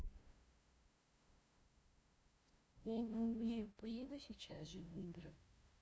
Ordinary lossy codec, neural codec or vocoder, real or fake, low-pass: none; codec, 16 kHz, 0.5 kbps, FunCodec, trained on LibriTTS, 25 frames a second; fake; none